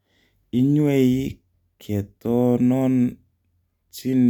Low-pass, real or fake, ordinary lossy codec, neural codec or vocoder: 19.8 kHz; real; none; none